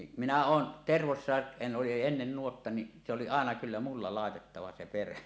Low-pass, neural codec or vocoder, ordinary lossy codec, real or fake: none; none; none; real